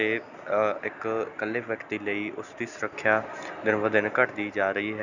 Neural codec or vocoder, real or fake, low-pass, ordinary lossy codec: none; real; 7.2 kHz; none